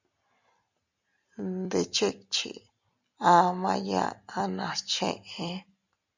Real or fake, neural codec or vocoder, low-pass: real; none; 7.2 kHz